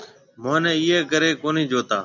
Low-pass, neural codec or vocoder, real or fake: 7.2 kHz; none; real